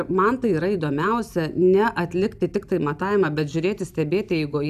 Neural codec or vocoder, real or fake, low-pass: none; real; 14.4 kHz